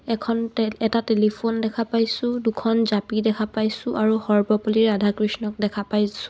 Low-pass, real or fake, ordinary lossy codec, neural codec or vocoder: none; real; none; none